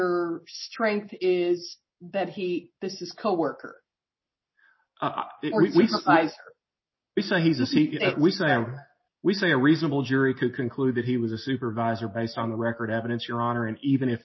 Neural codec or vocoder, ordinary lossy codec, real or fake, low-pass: none; MP3, 24 kbps; real; 7.2 kHz